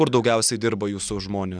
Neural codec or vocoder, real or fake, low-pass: none; real; 9.9 kHz